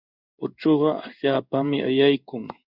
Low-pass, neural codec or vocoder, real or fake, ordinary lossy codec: 5.4 kHz; codec, 16 kHz in and 24 kHz out, 1 kbps, XY-Tokenizer; fake; Opus, 64 kbps